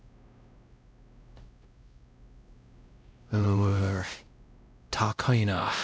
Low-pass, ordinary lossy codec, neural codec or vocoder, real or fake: none; none; codec, 16 kHz, 0.5 kbps, X-Codec, WavLM features, trained on Multilingual LibriSpeech; fake